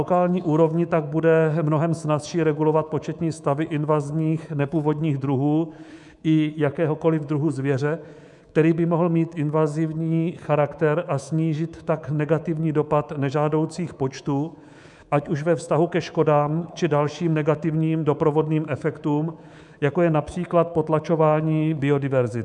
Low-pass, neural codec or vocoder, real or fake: 10.8 kHz; codec, 24 kHz, 3.1 kbps, DualCodec; fake